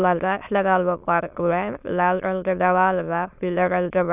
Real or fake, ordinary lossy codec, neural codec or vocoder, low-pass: fake; none; autoencoder, 22.05 kHz, a latent of 192 numbers a frame, VITS, trained on many speakers; 3.6 kHz